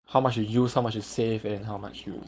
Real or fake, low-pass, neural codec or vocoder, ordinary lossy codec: fake; none; codec, 16 kHz, 4.8 kbps, FACodec; none